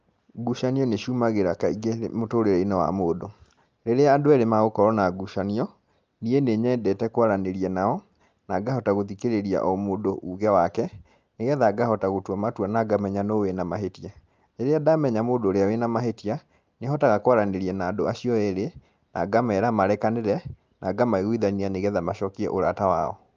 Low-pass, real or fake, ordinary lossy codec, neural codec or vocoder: 7.2 kHz; real; Opus, 24 kbps; none